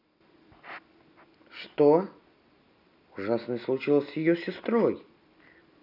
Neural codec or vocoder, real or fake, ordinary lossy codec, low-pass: none; real; none; 5.4 kHz